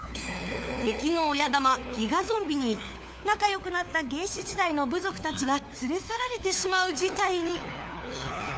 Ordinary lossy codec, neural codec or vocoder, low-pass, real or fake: none; codec, 16 kHz, 4 kbps, FunCodec, trained on LibriTTS, 50 frames a second; none; fake